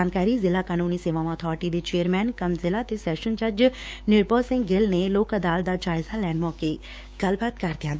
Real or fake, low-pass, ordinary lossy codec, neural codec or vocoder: fake; none; none; codec, 16 kHz, 6 kbps, DAC